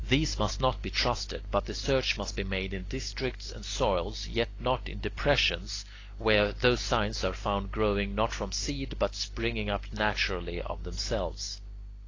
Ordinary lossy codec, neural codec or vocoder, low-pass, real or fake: AAC, 32 kbps; none; 7.2 kHz; real